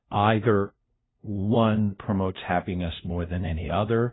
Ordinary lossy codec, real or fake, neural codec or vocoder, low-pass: AAC, 16 kbps; fake; codec, 16 kHz, 0.5 kbps, FunCodec, trained on LibriTTS, 25 frames a second; 7.2 kHz